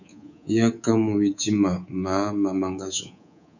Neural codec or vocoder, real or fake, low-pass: codec, 24 kHz, 3.1 kbps, DualCodec; fake; 7.2 kHz